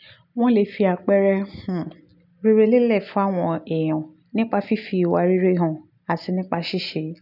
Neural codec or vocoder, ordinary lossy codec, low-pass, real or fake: none; none; 5.4 kHz; real